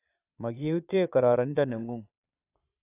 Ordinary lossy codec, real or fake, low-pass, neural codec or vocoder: AAC, 24 kbps; fake; 3.6 kHz; vocoder, 22.05 kHz, 80 mel bands, Vocos